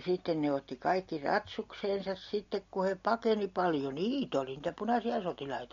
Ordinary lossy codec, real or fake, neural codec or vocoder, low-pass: MP3, 48 kbps; real; none; 7.2 kHz